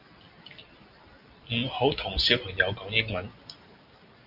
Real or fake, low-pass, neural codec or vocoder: real; 5.4 kHz; none